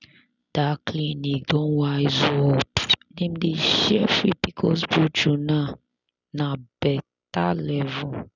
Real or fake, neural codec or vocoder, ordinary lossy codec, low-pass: real; none; none; 7.2 kHz